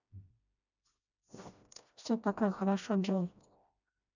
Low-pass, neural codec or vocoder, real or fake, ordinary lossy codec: 7.2 kHz; codec, 16 kHz, 1 kbps, FreqCodec, smaller model; fake; none